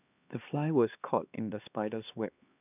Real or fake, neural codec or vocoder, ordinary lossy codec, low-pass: fake; codec, 16 kHz, 2 kbps, X-Codec, HuBERT features, trained on LibriSpeech; none; 3.6 kHz